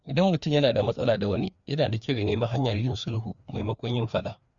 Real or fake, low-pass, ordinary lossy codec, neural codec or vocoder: fake; 7.2 kHz; Opus, 64 kbps; codec, 16 kHz, 2 kbps, FreqCodec, larger model